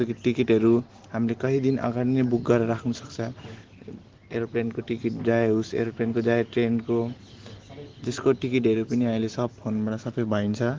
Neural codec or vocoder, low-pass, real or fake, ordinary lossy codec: none; 7.2 kHz; real; Opus, 16 kbps